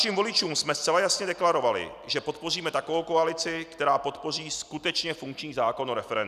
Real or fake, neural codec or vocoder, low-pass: real; none; 14.4 kHz